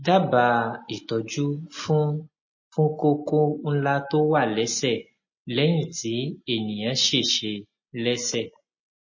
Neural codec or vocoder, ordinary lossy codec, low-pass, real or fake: none; MP3, 32 kbps; 7.2 kHz; real